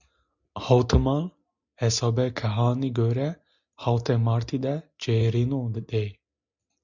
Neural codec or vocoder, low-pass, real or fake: none; 7.2 kHz; real